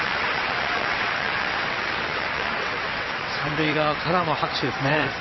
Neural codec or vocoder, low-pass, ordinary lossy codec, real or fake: vocoder, 22.05 kHz, 80 mel bands, WaveNeXt; 7.2 kHz; MP3, 24 kbps; fake